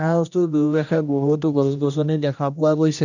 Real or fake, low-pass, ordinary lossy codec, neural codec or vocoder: fake; 7.2 kHz; none; codec, 16 kHz, 1 kbps, X-Codec, HuBERT features, trained on general audio